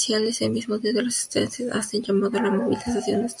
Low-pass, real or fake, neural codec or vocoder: 10.8 kHz; real; none